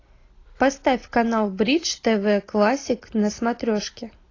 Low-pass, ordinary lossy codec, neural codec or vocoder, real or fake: 7.2 kHz; AAC, 32 kbps; none; real